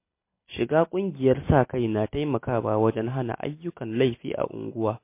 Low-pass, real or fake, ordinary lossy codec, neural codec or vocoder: 3.6 kHz; real; MP3, 24 kbps; none